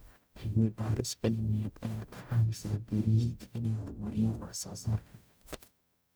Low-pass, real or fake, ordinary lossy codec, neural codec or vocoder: none; fake; none; codec, 44.1 kHz, 0.9 kbps, DAC